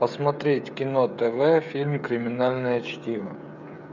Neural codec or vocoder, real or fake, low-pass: codec, 16 kHz, 16 kbps, FreqCodec, smaller model; fake; 7.2 kHz